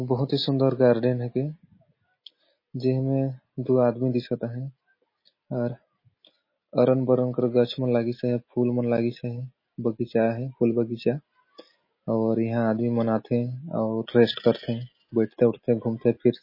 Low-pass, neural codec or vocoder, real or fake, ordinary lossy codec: 5.4 kHz; none; real; MP3, 24 kbps